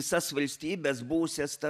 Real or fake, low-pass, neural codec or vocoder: real; 14.4 kHz; none